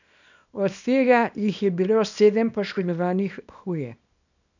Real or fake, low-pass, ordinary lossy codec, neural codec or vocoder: fake; 7.2 kHz; none; codec, 24 kHz, 0.9 kbps, WavTokenizer, small release